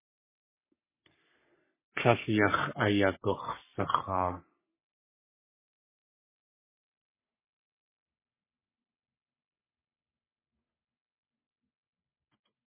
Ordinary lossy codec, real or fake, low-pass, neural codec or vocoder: MP3, 16 kbps; fake; 3.6 kHz; codec, 44.1 kHz, 3.4 kbps, Pupu-Codec